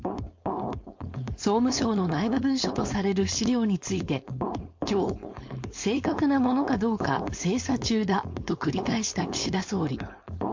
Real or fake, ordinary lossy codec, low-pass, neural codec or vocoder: fake; MP3, 48 kbps; 7.2 kHz; codec, 16 kHz, 4.8 kbps, FACodec